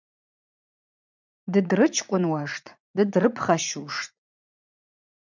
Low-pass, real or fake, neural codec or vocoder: 7.2 kHz; fake; vocoder, 44.1 kHz, 80 mel bands, Vocos